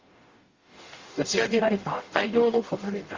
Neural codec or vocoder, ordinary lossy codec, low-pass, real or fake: codec, 44.1 kHz, 0.9 kbps, DAC; Opus, 32 kbps; 7.2 kHz; fake